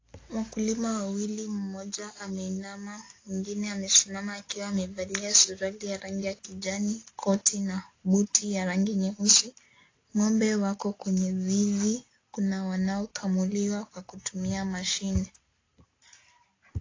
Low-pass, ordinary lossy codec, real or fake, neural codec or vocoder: 7.2 kHz; AAC, 32 kbps; real; none